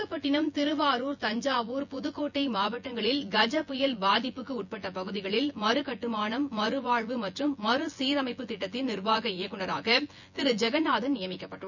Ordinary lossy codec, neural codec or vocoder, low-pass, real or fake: none; vocoder, 24 kHz, 100 mel bands, Vocos; 7.2 kHz; fake